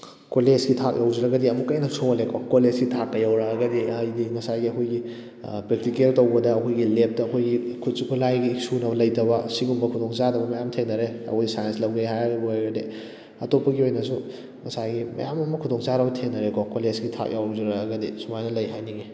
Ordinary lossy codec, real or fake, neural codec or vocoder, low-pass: none; real; none; none